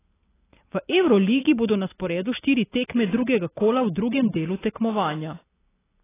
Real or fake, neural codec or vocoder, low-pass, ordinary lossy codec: real; none; 3.6 kHz; AAC, 16 kbps